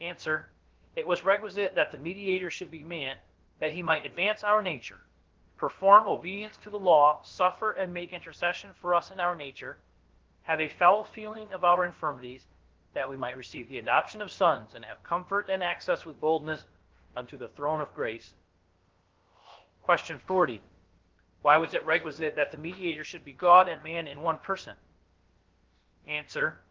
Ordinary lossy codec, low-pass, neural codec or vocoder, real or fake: Opus, 16 kbps; 7.2 kHz; codec, 16 kHz, about 1 kbps, DyCAST, with the encoder's durations; fake